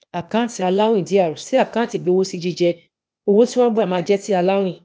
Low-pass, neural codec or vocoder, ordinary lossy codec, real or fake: none; codec, 16 kHz, 0.8 kbps, ZipCodec; none; fake